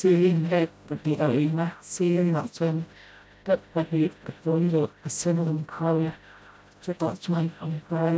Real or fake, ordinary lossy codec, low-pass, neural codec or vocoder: fake; none; none; codec, 16 kHz, 0.5 kbps, FreqCodec, smaller model